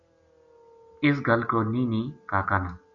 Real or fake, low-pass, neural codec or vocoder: real; 7.2 kHz; none